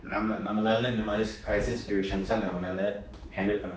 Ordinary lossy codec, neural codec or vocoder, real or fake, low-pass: none; codec, 16 kHz, 2 kbps, X-Codec, HuBERT features, trained on general audio; fake; none